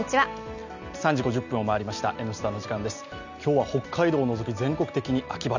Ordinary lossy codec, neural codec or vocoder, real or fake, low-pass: none; none; real; 7.2 kHz